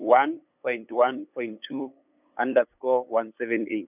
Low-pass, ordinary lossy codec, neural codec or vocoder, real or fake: 3.6 kHz; none; codec, 16 kHz, 8 kbps, FunCodec, trained on LibriTTS, 25 frames a second; fake